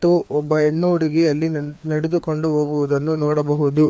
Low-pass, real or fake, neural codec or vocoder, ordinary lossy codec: none; fake; codec, 16 kHz, 2 kbps, FreqCodec, larger model; none